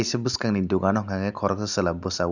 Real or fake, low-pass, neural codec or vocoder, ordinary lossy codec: real; 7.2 kHz; none; none